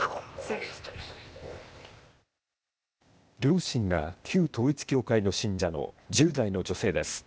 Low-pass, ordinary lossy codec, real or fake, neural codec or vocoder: none; none; fake; codec, 16 kHz, 0.8 kbps, ZipCodec